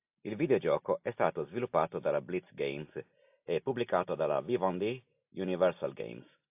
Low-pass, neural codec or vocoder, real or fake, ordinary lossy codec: 3.6 kHz; none; real; AAC, 32 kbps